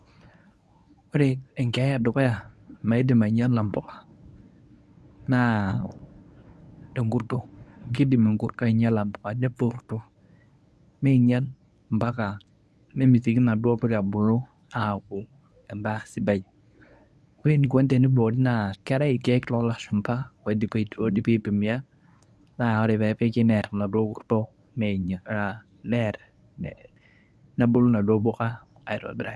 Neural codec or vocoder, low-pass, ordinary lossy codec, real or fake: codec, 24 kHz, 0.9 kbps, WavTokenizer, medium speech release version 1; none; none; fake